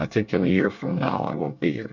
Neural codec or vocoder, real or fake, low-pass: codec, 24 kHz, 1 kbps, SNAC; fake; 7.2 kHz